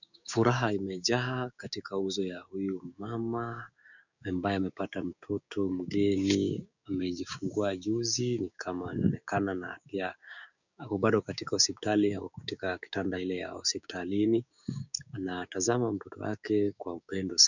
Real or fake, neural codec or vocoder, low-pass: fake; codec, 16 kHz, 6 kbps, DAC; 7.2 kHz